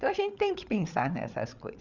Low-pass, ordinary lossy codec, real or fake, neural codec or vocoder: 7.2 kHz; none; fake; codec, 16 kHz, 8 kbps, FreqCodec, larger model